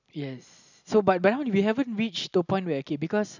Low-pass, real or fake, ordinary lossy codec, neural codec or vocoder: 7.2 kHz; real; none; none